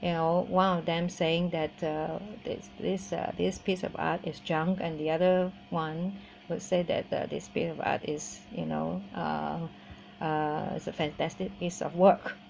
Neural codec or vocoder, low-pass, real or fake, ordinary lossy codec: none; none; real; none